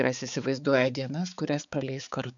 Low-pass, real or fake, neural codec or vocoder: 7.2 kHz; fake; codec, 16 kHz, 4 kbps, X-Codec, HuBERT features, trained on balanced general audio